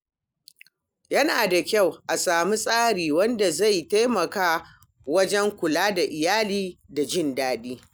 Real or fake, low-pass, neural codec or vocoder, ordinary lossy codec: real; none; none; none